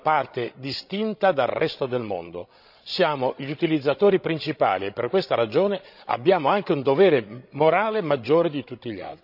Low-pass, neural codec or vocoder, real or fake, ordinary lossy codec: 5.4 kHz; codec, 16 kHz, 16 kbps, FreqCodec, larger model; fake; none